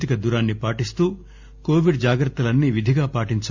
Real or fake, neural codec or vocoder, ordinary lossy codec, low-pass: real; none; Opus, 64 kbps; 7.2 kHz